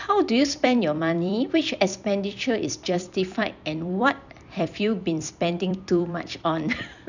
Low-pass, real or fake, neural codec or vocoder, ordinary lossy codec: 7.2 kHz; fake; vocoder, 44.1 kHz, 128 mel bands every 256 samples, BigVGAN v2; none